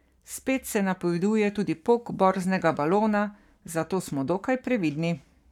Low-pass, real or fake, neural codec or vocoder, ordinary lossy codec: 19.8 kHz; fake; codec, 44.1 kHz, 7.8 kbps, Pupu-Codec; none